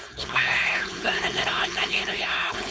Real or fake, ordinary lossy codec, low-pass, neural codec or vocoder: fake; none; none; codec, 16 kHz, 4.8 kbps, FACodec